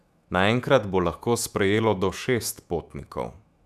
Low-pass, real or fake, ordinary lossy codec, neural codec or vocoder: 14.4 kHz; fake; none; autoencoder, 48 kHz, 128 numbers a frame, DAC-VAE, trained on Japanese speech